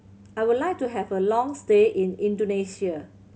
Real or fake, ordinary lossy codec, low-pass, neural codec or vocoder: real; none; none; none